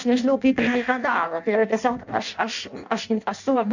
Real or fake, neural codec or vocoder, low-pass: fake; codec, 16 kHz in and 24 kHz out, 0.6 kbps, FireRedTTS-2 codec; 7.2 kHz